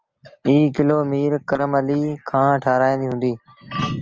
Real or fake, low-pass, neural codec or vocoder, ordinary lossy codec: real; 7.2 kHz; none; Opus, 24 kbps